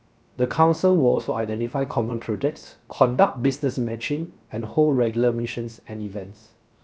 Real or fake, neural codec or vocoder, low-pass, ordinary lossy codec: fake; codec, 16 kHz, 0.7 kbps, FocalCodec; none; none